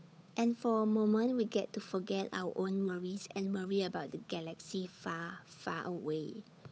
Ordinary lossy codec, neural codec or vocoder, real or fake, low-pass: none; codec, 16 kHz, 8 kbps, FunCodec, trained on Chinese and English, 25 frames a second; fake; none